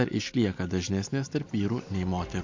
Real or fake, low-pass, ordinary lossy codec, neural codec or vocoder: real; 7.2 kHz; MP3, 48 kbps; none